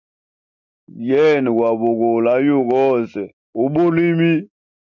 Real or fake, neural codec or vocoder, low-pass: real; none; 7.2 kHz